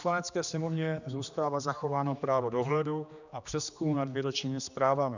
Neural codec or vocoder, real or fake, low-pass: codec, 16 kHz, 2 kbps, X-Codec, HuBERT features, trained on general audio; fake; 7.2 kHz